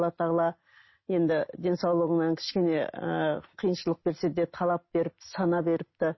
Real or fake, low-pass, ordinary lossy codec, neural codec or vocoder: real; 7.2 kHz; MP3, 24 kbps; none